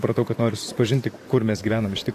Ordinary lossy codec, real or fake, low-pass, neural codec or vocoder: AAC, 64 kbps; fake; 14.4 kHz; vocoder, 44.1 kHz, 128 mel bands, Pupu-Vocoder